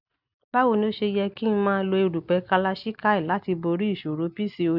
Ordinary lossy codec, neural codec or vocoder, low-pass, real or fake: none; none; 5.4 kHz; real